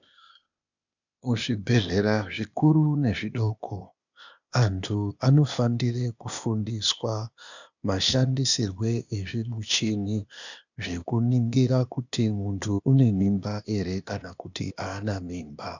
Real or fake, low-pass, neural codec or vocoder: fake; 7.2 kHz; codec, 16 kHz, 0.8 kbps, ZipCodec